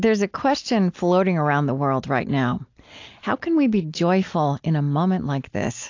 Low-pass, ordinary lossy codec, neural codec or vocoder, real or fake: 7.2 kHz; AAC, 48 kbps; none; real